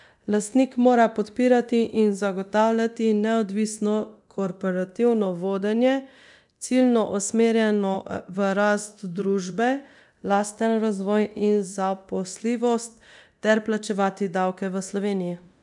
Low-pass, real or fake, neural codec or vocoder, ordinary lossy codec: 10.8 kHz; fake; codec, 24 kHz, 0.9 kbps, DualCodec; none